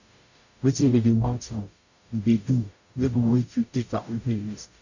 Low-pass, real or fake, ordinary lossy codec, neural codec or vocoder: 7.2 kHz; fake; none; codec, 44.1 kHz, 0.9 kbps, DAC